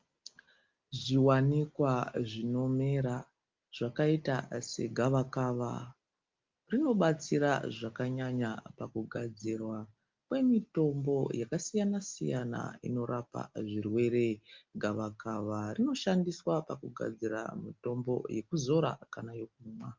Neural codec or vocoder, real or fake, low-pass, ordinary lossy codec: none; real; 7.2 kHz; Opus, 24 kbps